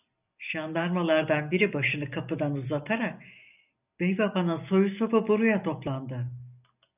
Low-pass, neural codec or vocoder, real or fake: 3.6 kHz; none; real